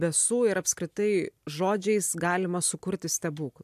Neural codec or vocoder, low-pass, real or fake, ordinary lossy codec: none; 14.4 kHz; real; AAC, 96 kbps